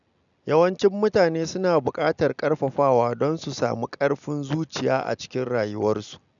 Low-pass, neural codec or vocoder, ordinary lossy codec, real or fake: 7.2 kHz; none; none; real